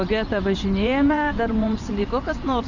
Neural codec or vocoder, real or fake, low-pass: vocoder, 24 kHz, 100 mel bands, Vocos; fake; 7.2 kHz